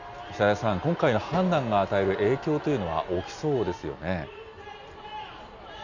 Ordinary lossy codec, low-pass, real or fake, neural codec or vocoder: Opus, 64 kbps; 7.2 kHz; real; none